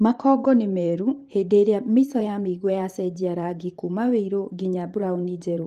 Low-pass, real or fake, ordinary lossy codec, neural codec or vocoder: 14.4 kHz; fake; Opus, 24 kbps; vocoder, 44.1 kHz, 128 mel bands every 512 samples, BigVGAN v2